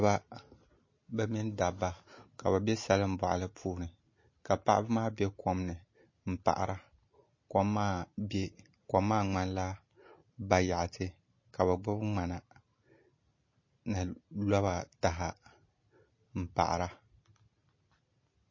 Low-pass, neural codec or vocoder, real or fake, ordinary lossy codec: 7.2 kHz; none; real; MP3, 32 kbps